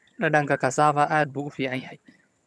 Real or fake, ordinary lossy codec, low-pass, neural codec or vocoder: fake; none; none; vocoder, 22.05 kHz, 80 mel bands, HiFi-GAN